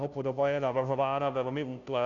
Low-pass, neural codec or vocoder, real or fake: 7.2 kHz; codec, 16 kHz, 0.5 kbps, FunCodec, trained on Chinese and English, 25 frames a second; fake